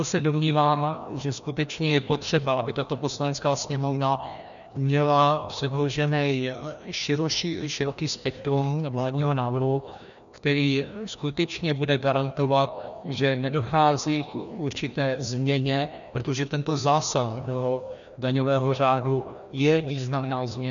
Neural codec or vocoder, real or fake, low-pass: codec, 16 kHz, 1 kbps, FreqCodec, larger model; fake; 7.2 kHz